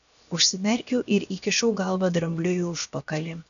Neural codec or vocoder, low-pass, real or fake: codec, 16 kHz, 0.7 kbps, FocalCodec; 7.2 kHz; fake